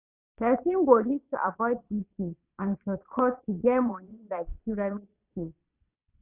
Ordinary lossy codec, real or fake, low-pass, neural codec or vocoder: none; fake; 3.6 kHz; vocoder, 22.05 kHz, 80 mel bands, Vocos